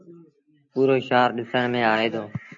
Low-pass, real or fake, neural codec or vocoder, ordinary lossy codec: 7.2 kHz; real; none; AAC, 64 kbps